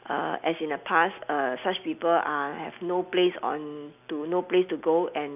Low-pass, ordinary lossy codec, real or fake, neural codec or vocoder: 3.6 kHz; none; real; none